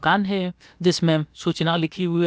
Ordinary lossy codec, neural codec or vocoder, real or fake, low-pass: none; codec, 16 kHz, about 1 kbps, DyCAST, with the encoder's durations; fake; none